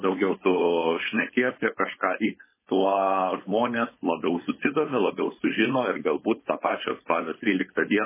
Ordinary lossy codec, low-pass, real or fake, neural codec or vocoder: MP3, 16 kbps; 3.6 kHz; fake; codec, 16 kHz, 4.8 kbps, FACodec